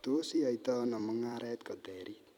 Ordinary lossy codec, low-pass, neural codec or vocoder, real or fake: none; 19.8 kHz; vocoder, 44.1 kHz, 128 mel bands every 256 samples, BigVGAN v2; fake